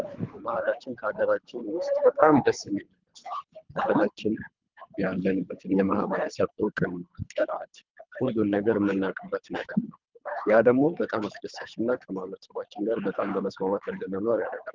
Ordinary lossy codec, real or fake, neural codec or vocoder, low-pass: Opus, 24 kbps; fake; codec, 24 kHz, 3 kbps, HILCodec; 7.2 kHz